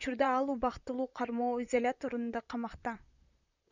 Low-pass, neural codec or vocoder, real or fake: 7.2 kHz; vocoder, 44.1 kHz, 128 mel bands, Pupu-Vocoder; fake